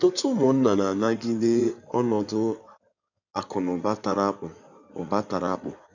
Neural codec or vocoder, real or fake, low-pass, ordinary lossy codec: codec, 16 kHz in and 24 kHz out, 2.2 kbps, FireRedTTS-2 codec; fake; 7.2 kHz; none